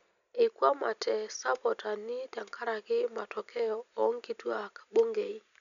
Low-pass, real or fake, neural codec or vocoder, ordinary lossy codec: 7.2 kHz; real; none; none